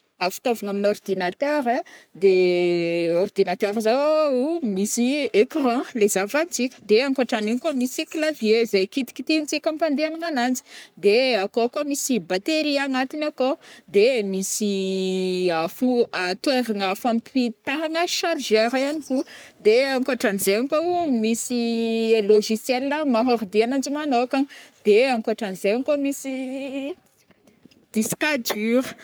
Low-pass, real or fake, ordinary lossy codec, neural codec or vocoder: none; fake; none; codec, 44.1 kHz, 3.4 kbps, Pupu-Codec